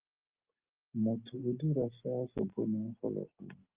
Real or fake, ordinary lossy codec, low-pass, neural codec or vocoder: real; Opus, 24 kbps; 3.6 kHz; none